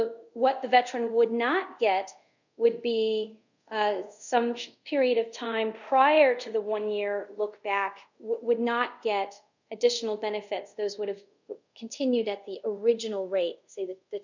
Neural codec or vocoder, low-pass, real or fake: codec, 24 kHz, 0.5 kbps, DualCodec; 7.2 kHz; fake